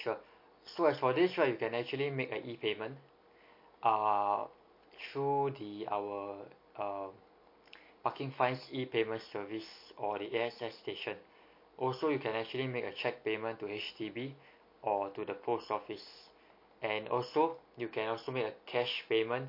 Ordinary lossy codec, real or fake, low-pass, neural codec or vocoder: MP3, 32 kbps; real; 5.4 kHz; none